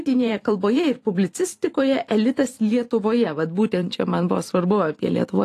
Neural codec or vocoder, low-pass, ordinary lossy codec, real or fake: vocoder, 44.1 kHz, 128 mel bands every 512 samples, BigVGAN v2; 14.4 kHz; AAC, 48 kbps; fake